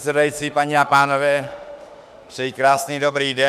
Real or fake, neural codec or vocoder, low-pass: fake; autoencoder, 48 kHz, 32 numbers a frame, DAC-VAE, trained on Japanese speech; 14.4 kHz